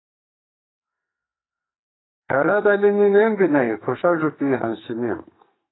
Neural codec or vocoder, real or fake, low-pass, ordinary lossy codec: codec, 44.1 kHz, 2.6 kbps, SNAC; fake; 7.2 kHz; AAC, 16 kbps